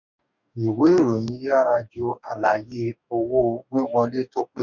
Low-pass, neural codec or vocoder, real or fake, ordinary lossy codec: 7.2 kHz; codec, 44.1 kHz, 2.6 kbps, DAC; fake; none